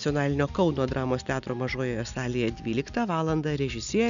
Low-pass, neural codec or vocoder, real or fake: 7.2 kHz; none; real